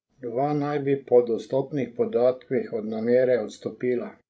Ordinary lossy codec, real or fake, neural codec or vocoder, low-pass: none; fake; codec, 16 kHz, 16 kbps, FreqCodec, larger model; none